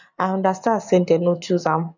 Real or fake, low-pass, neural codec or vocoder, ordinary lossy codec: real; 7.2 kHz; none; none